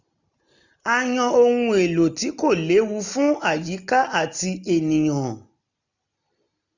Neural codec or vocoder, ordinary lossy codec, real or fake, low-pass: none; none; real; 7.2 kHz